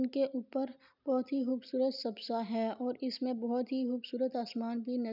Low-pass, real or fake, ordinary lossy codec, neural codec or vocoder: 5.4 kHz; real; none; none